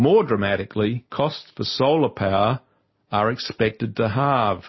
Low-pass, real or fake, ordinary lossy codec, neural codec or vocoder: 7.2 kHz; real; MP3, 24 kbps; none